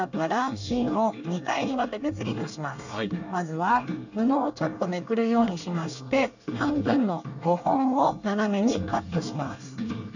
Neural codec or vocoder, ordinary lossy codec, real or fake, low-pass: codec, 24 kHz, 1 kbps, SNAC; none; fake; 7.2 kHz